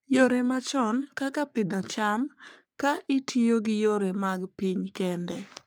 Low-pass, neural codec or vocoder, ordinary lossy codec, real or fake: none; codec, 44.1 kHz, 3.4 kbps, Pupu-Codec; none; fake